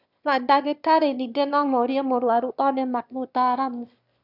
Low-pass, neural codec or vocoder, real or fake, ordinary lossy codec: 5.4 kHz; autoencoder, 22.05 kHz, a latent of 192 numbers a frame, VITS, trained on one speaker; fake; none